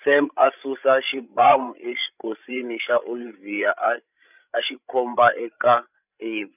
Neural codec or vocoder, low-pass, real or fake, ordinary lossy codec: codec, 16 kHz, 16 kbps, FreqCodec, larger model; 3.6 kHz; fake; none